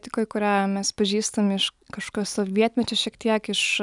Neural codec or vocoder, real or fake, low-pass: none; real; 10.8 kHz